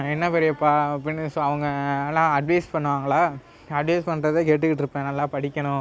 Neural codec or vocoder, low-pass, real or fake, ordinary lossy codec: none; none; real; none